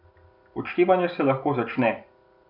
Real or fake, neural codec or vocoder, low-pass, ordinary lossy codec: real; none; 5.4 kHz; none